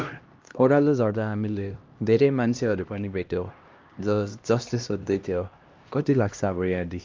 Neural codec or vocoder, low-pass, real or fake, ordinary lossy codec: codec, 16 kHz, 1 kbps, X-Codec, HuBERT features, trained on LibriSpeech; 7.2 kHz; fake; Opus, 32 kbps